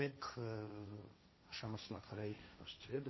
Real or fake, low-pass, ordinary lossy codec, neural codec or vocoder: fake; 7.2 kHz; MP3, 24 kbps; codec, 16 kHz, 1.1 kbps, Voila-Tokenizer